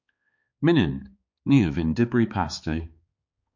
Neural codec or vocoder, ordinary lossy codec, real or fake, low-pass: codec, 16 kHz, 4 kbps, X-Codec, HuBERT features, trained on balanced general audio; MP3, 48 kbps; fake; 7.2 kHz